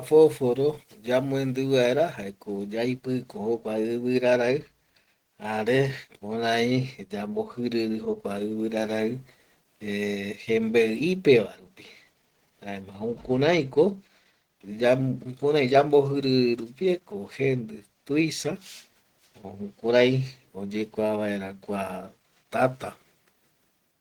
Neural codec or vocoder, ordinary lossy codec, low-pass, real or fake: none; Opus, 16 kbps; 19.8 kHz; real